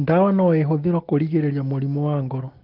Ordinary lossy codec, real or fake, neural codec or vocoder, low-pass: Opus, 16 kbps; real; none; 5.4 kHz